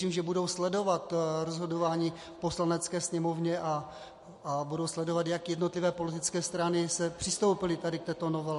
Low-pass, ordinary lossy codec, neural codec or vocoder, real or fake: 14.4 kHz; MP3, 48 kbps; none; real